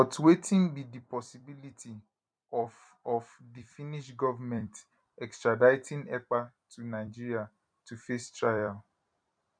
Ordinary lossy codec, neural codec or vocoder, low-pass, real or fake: none; vocoder, 44.1 kHz, 128 mel bands every 256 samples, BigVGAN v2; 9.9 kHz; fake